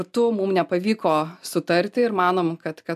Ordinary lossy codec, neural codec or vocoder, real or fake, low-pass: AAC, 96 kbps; none; real; 14.4 kHz